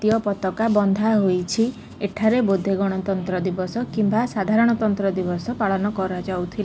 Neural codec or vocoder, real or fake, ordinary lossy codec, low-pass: none; real; none; none